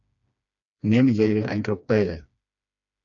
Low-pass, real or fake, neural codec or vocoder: 7.2 kHz; fake; codec, 16 kHz, 2 kbps, FreqCodec, smaller model